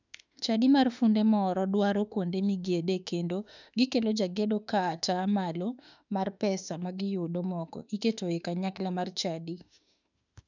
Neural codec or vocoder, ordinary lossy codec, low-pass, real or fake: autoencoder, 48 kHz, 32 numbers a frame, DAC-VAE, trained on Japanese speech; none; 7.2 kHz; fake